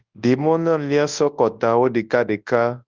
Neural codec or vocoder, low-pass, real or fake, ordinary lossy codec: codec, 24 kHz, 0.9 kbps, WavTokenizer, large speech release; 7.2 kHz; fake; Opus, 24 kbps